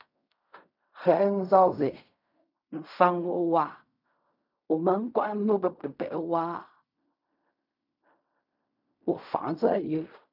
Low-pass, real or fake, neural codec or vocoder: 5.4 kHz; fake; codec, 16 kHz in and 24 kHz out, 0.4 kbps, LongCat-Audio-Codec, fine tuned four codebook decoder